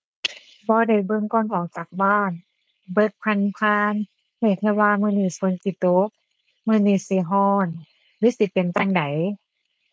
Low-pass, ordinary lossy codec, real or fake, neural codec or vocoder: none; none; fake; codec, 16 kHz, 4.8 kbps, FACodec